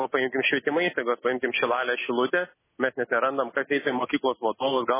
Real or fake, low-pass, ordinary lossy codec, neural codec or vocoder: real; 3.6 kHz; MP3, 16 kbps; none